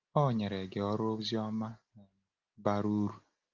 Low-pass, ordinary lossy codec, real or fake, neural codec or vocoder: 7.2 kHz; Opus, 32 kbps; real; none